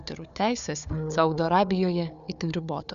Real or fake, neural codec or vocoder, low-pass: fake; codec, 16 kHz, 4 kbps, FunCodec, trained on Chinese and English, 50 frames a second; 7.2 kHz